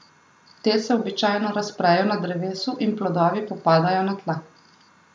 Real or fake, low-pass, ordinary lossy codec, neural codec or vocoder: real; none; none; none